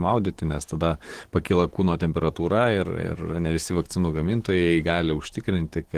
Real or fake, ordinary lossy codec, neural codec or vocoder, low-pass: fake; Opus, 16 kbps; codec, 44.1 kHz, 7.8 kbps, DAC; 14.4 kHz